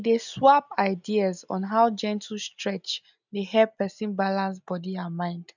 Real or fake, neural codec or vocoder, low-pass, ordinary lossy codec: real; none; 7.2 kHz; none